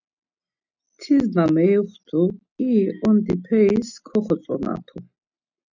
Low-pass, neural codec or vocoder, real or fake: 7.2 kHz; none; real